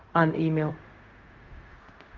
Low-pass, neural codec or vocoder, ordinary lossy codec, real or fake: 7.2 kHz; codec, 16 kHz, 0.4 kbps, LongCat-Audio-Codec; Opus, 24 kbps; fake